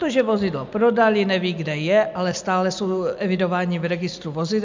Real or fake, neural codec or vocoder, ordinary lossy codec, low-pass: real; none; MP3, 64 kbps; 7.2 kHz